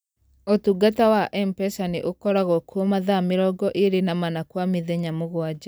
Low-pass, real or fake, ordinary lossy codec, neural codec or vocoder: none; real; none; none